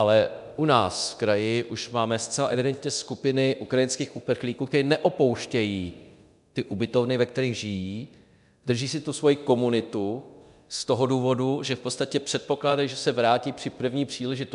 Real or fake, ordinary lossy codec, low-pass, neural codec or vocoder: fake; MP3, 96 kbps; 10.8 kHz; codec, 24 kHz, 0.9 kbps, DualCodec